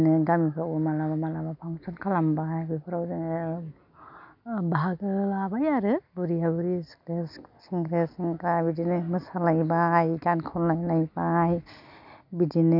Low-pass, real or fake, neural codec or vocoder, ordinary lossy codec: 5.4 kHz; real; none; none